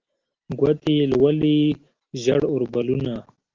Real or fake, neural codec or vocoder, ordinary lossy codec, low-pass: real; none; Opus, 16 kbps; 7.2 kHz